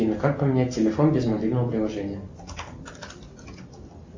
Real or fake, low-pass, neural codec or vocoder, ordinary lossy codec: real; 7.2 kHz; none; MP3, 64 kbps